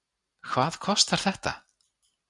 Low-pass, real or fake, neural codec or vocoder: 10.8 kHz; real; none